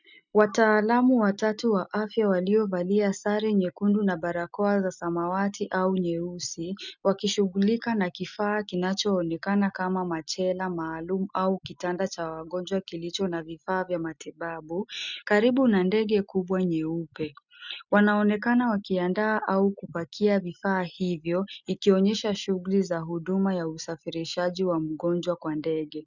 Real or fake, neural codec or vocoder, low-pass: real; none; 7.2 kHz